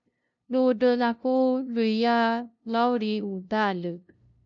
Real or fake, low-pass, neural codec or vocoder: fake; 7.2 kHz; codec, 16 kHz, 0.5 kbps, FunCodec, trained on LibriTTS, 25 frames a second